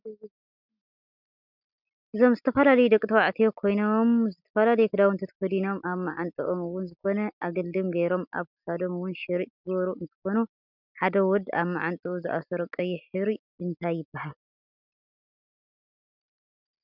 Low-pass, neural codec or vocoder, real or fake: 5.4 kHz; none; real